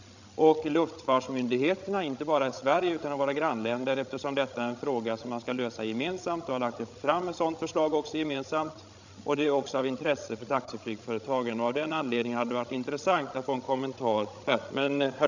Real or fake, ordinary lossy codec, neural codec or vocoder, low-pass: fake; none; codec, 16 kHz, 16 kbps, FreqCodec, larger model; 7.2 kHz